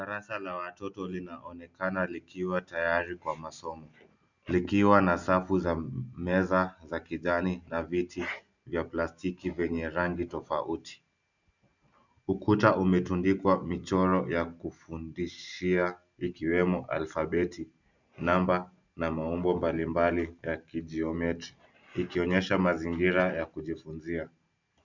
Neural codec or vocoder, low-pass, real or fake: none; 7.2 kHz; real